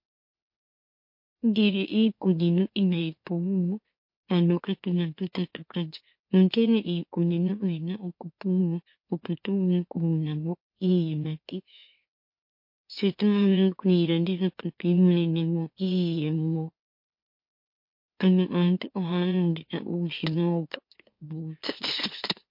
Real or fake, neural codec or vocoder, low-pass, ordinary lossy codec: fake; autoencoder, 44.1 kHz, a latent of 192 numbers a frame, MeloTTS; 5.4 kHz; MP3, 32 kbps